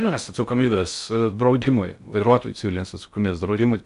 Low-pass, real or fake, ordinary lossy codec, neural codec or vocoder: 10.8 kHz; fake; MP3, 96 kbps; codec, 16 kHz in and 24 kHz out, 0.6 kbps, FocalCodec, streaming, 4096 codes